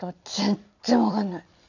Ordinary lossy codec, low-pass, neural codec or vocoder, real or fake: none; 7.2 kHz; none; real